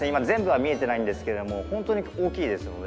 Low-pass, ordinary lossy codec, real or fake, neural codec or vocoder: none; none; real; none